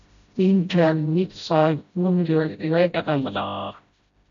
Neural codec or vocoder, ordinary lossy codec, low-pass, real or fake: codec, 16 kHz, 0.5 kbps, FreqCodec, smaller model; AAC, 64 kbps; 7.2 kHz; fake